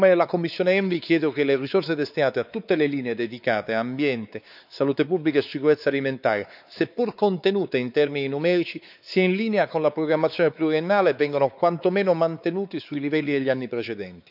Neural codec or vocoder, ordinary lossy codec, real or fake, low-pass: codec, 16 kHz, 4 kbps, X-Codec, WavLM features, trained on Multilingual LibriSpeech; none; fake; 5.4 kHz